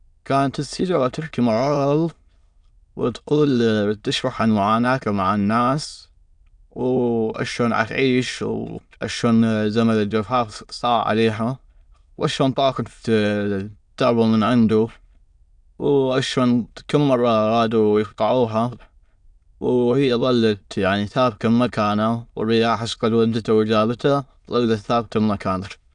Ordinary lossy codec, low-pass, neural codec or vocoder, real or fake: none; 9.9 kHz; autoencoder, 22.05 kHz, a latent of 192 numbers a frame, VITS, trained on many speakers; fake